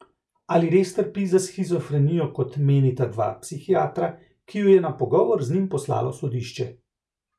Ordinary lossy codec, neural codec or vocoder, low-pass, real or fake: none; none; none; real